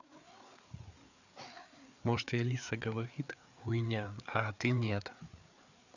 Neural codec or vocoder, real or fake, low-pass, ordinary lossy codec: codec, 16 kHz, 4 kbps, FreqCodec, larger model; fake; 7.2 kHz; none